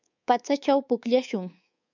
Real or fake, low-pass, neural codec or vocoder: fake; 7.2 kHz; codec, 24 kHz, 3.1 kbps, DualCodec